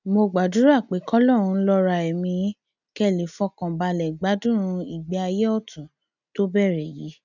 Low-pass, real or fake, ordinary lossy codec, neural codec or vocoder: 7.2 kHz; real; none; none